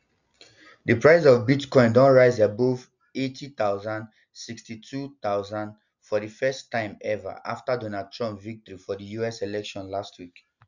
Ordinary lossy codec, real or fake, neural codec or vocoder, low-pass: none; real; none; 7.2 kHz